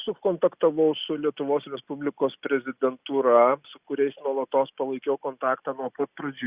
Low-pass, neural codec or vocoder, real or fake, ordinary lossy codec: 3.6 kHz; none; real; Opus, 64 kbps